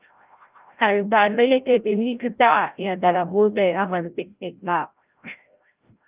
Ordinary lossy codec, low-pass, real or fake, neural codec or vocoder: Opus, 32 kbps; 3.6 kHz; fake; codec, 16 kHz, 0.5 kbps, FreqCodec, larger model